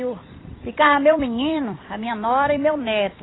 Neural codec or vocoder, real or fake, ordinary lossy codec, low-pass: vocoder, 44.1 kHz, 128 mel bands every 256 samples, BigVGAN v2; fake; AAC, 16 kbps; 7.2 kHz